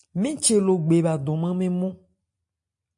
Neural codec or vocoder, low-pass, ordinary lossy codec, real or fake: none; 10.8 kHz; MP3, 48 kbps; real